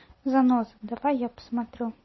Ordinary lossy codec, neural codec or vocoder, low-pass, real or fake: MP3, 24 kbps; none; 7.2 kHz; real